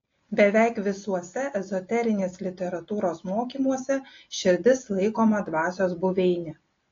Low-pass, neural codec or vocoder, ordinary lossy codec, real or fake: 7.2 kHz; none; AAC, 32 kbps; real